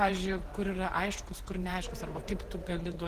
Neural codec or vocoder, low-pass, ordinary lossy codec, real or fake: vocoder, 48 kHz, 128 mel bands, Vocos; 14.4 kHz; Opus, 16 kbps; fake